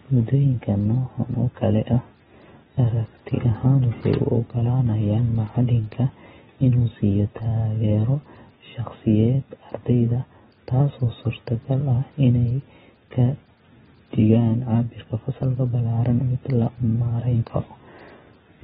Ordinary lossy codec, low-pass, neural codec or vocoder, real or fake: AAC, 16 kbps; 10.8 kHz; none; real